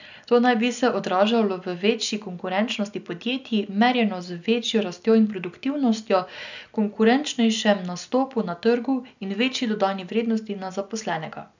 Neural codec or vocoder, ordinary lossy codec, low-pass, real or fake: none; none; 7.2 kHz; real